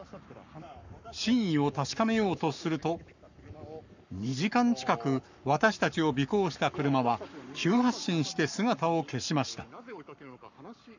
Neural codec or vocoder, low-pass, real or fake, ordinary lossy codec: codec, 44.1 kHz, 7.8 kbps, Pupu-Codec; 7.2 kHz; fake; none